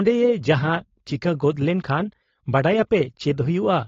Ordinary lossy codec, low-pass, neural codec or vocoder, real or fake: AAC, 32 kbps; 7.2 kHz; codec, 16 kHz, 4.8 kbps, FACodec; fake